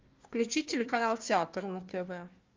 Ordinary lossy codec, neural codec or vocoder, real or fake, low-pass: Opus, 32 kbps; codec, 16 kHz, 1 kbps, FunCodec, trained on Chinese and English, 50 frames a second; fake; 7.2 kHz